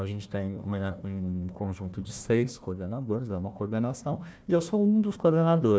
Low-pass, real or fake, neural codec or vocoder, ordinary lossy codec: none; fake; codec, 16 kHz, 1 kbps, FunCodec, trained on Chinese and English, 50 frames a second; none